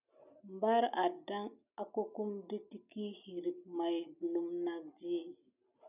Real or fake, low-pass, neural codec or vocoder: real; 3.6 kHz; none